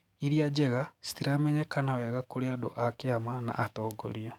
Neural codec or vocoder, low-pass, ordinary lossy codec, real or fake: codec, 44.1 kHz, 7.8 kbps, DAC; 19.8 kHz; none; fake